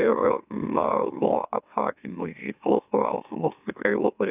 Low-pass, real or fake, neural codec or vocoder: 3.6 kHz; fake; autoencoder, 44.1 kHz, a latent of 192 numbers a frame, MeloTTS